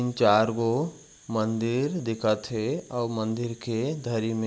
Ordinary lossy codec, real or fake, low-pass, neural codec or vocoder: none; real; none; none